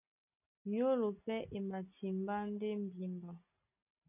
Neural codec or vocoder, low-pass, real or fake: none; 3.6 kHz; real